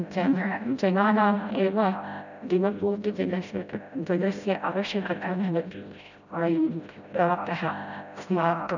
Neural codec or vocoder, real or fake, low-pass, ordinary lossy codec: codec, 16 kHz, 0.5 kbps, FreqCodec, smaller model; fake; 7.2 kHz; none